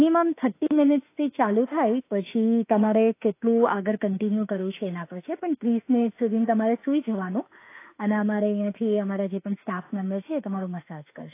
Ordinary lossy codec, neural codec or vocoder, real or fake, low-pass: AAC, 24 kbps; autoencoder, 48 kHz, 32 numbers a frame, DAC-VAE, trained on Japanese speech; fake; 3.6 kHz